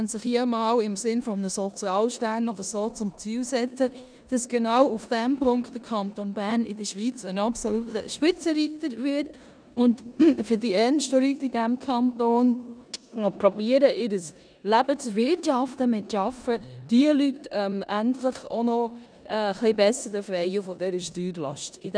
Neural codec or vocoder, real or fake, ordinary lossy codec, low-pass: codec, 16 kHz in and 24 kHz out, 0.9 kbps, LongCat-Audio-Codec, four codebook decoder; fake; none; 9.9 kHz